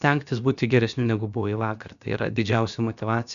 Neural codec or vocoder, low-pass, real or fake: codec, 16 kHz, about 1 kbps, DyCAST, with the encoder's durations; 7.2 kHz; fake